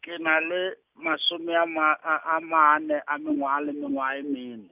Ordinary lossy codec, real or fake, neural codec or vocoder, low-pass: none; real; none; 3.6 kHz